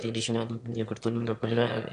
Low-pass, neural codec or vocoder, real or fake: 9.9 kHz; autoencoder, 22.05 kHz, a latent of 192 numbers a frame, VITS, trained on one speaker; fake